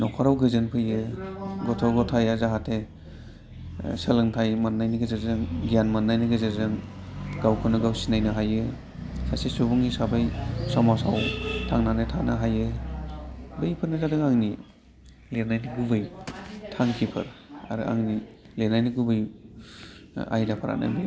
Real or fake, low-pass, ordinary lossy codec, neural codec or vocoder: real; none; none; none